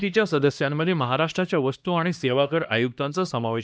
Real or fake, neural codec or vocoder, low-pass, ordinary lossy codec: fake; codec, 16 kHz, 1 kbps, X-Codec, HuBERT features, trained on LibriSpeech; none; none